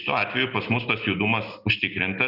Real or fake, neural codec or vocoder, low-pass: real; none; 5.4 kHz